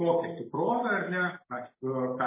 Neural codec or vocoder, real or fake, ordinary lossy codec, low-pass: none; real; MP3, 16 kbps; 3.6 kHz